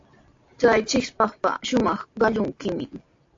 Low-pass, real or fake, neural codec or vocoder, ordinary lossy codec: 7.2 kHz; real; none; AAC, 48 kbps